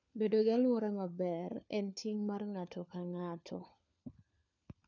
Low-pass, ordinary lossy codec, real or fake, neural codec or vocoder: 7.2 kHz; none; fake; codec, 16 kHz, 4 kbps, FunCodec, trained on LibriTTS, 50 frames a second